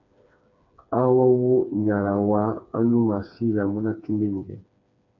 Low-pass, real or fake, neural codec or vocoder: 7.2 kHz; fake; codec, 16 kHz, 4 kbps, FreqCodec, smaller model